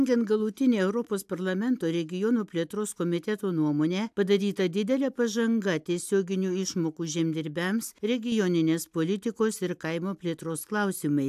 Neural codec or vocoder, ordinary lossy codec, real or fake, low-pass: none; AAC, 96 kbps; real; 14.4 kHz